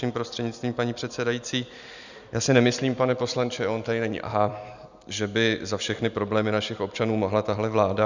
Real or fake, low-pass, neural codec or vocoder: real; 7.2 kHz; none